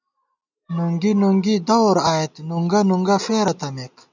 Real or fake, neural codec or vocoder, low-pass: real; none; 7.2 kHz